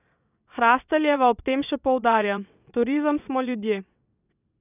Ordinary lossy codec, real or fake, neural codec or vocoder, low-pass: none; fake; vocoder, 22.05 kHz, 80 mel bands, WaveNeXt; 3.6 kHz